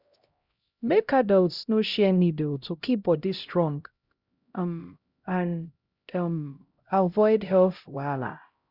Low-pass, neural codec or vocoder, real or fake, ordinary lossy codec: 5.4 kHz; codec, 16 kHz, 0.5 kbps, X-Codec, HuBERT features, trained on LibriSpeech; fake; Opus, 64 kbps